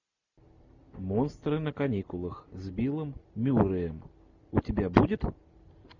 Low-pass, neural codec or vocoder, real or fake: 7.2 kHz; none; real